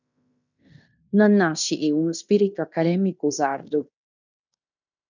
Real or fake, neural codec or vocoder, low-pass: fake; codec, 16 kHz in and 24 kHz out, 0.9 kbps, LongCat-Audio-Codec, fine tuned four codebook decoder; 7.2 kHz